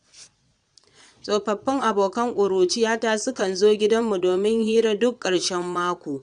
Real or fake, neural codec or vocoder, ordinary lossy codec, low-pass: fake; vocoder, 22.05 kHz, 80 mel bands, Vocos; MP3, 96 kbps; 9.9 kHz